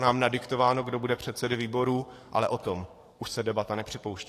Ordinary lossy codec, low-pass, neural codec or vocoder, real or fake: AAC, 48 kbps; 14.4 kHz; codec, 44.1 kHz, 7.8 kbps, DAC; fake